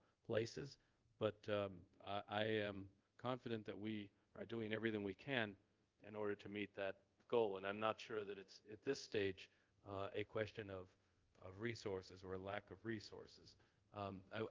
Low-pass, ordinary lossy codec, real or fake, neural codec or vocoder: 7.2 kHz; Opus, 24 kbps; fake; codec, 24 kHz, 0.5 kbps, DualCodec